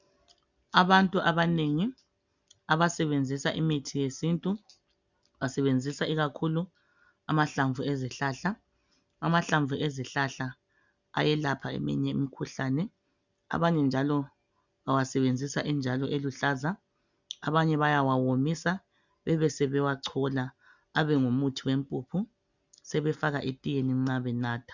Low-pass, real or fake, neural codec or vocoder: 7.2 kHz; real; none